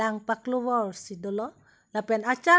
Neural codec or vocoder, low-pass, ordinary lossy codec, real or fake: none; none; none; real